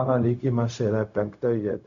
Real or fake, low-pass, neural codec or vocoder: fake; 7.2 kHz; codec, 16 kHz, 0.4 kbps, LongCat-Audio-Codec